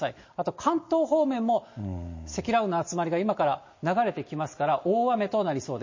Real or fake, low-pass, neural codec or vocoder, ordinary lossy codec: real; 7.2 kHz; none; MP3, 32 kbps